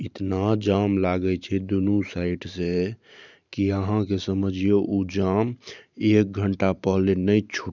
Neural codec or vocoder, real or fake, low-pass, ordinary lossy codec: vocoder, 44.1 kHz, 128 mel bands every 512 samples, BigVGAN v2; fake; 7.2 kHz; none